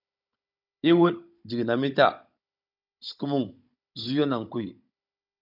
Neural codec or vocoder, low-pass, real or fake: codec, 16 kHz, 16 kbps, FunCodec, trained on Chinese and English, 50 frames a second; 5.4 kHz; fake